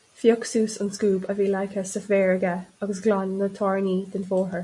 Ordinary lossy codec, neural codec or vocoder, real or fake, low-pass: MP3, 64 kbps; none; real; 10.8 kHz